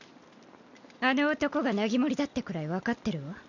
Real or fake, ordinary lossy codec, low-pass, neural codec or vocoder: real; none; 7.2 kHz; none